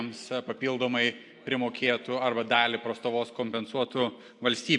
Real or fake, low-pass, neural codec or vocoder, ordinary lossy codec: real; 10.8 kHz; none; AAC, 64 kbps